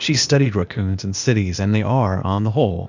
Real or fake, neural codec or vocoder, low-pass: fake; codec, 16 kHz, 0.8 kbps, ZipCodec; 7.2 kHz